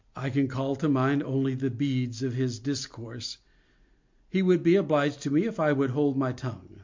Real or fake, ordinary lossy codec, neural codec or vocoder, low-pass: real; MP3, 48 kbps; none; 7.2 kHz